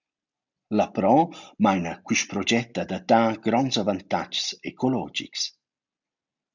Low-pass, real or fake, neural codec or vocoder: 7.2 kHz; real; none